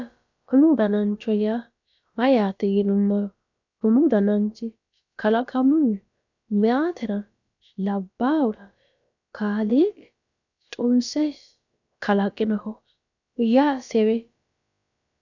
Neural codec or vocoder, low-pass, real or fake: codec, 16 kHz, about 1 kbps, DyCAST, with the encoder's durations; 7.2 kHz; fake